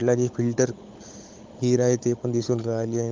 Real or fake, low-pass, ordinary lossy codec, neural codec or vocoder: fake; 7.2 kHz; Opus, 32 kbps; codec, 16 kHz, 16 kbps, FunCodec, trained on Chinese and English, 50 frames a second